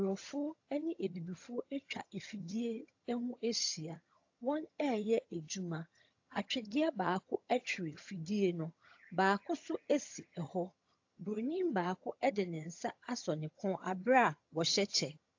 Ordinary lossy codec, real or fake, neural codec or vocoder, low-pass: AAC, 48 kbps; fake; vocoder, 22.05 kHz, 80 mel bands, HiFi-GAN; 7.2 kHz